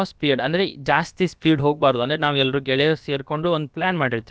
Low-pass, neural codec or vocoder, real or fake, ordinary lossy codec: none; codec, 16 kHz, about 1 kbps, DyCAST, with the encoder's durations; fake; none